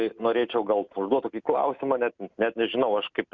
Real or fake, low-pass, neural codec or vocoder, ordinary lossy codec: real; 7.2 kHz; none; Opus, 64 kbps